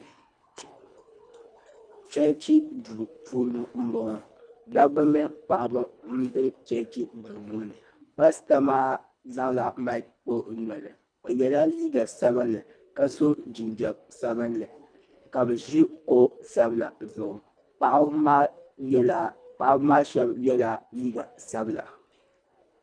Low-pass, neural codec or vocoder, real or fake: 9.9 kHz; codec, 24 kHz, 1.5 kbps, HILCodec; fake